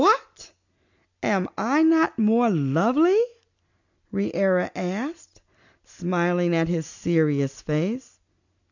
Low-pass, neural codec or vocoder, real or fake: 7.2 kHz; none; real